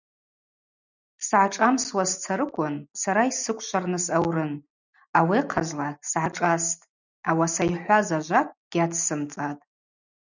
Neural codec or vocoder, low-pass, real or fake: none; 7.2 kHz; real